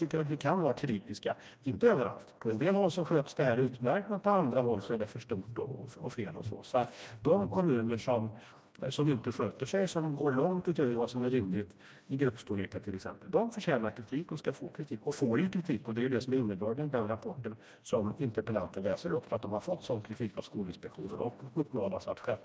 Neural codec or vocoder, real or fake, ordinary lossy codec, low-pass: codec, 16 kHz, 1 kbps, FreqCodec, smaller model; fake; none; none